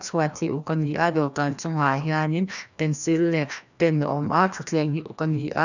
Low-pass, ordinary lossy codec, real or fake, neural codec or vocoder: 7.2 kHz; none; fake; codec, 16 kHz, 1 kbps, FreqCodec, larger model